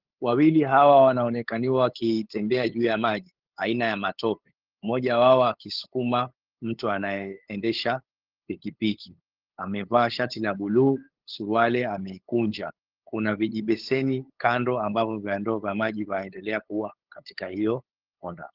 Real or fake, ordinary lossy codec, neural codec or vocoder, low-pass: fake; Opus, 16 kbps; codec, 16 kHz, 8 kbps, FunCodec, trained on LibriTTS, 25 frames a second; 5.4 kHz